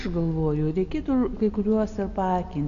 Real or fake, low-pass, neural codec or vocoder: real; 7.2 kHz; none